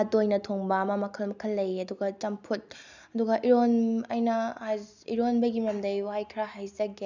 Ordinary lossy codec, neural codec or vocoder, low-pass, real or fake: none; none; 7.2 kHz; real